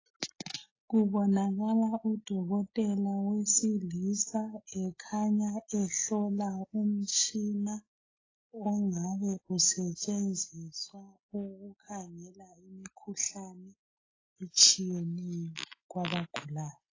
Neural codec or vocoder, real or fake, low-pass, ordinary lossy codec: none; real; 7.2 kHz; AAC, 32 kbps